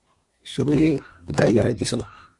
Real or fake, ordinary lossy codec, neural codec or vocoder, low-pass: fake; MP3, 64 kbps; codec, 24 kHz, 1 kbps, SNAC; 10.8 kHz